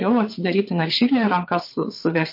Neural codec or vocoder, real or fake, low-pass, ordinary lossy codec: vocoder, 22.05 kHz, 80 mel bands, WaveNeXt; fake; 5.4 kHz; MP3, 32 kbps